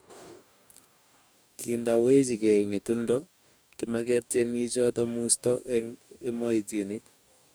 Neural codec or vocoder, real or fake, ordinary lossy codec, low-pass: codec, 44.1 kHz, 2.6 kbps, DAC; fake; none; none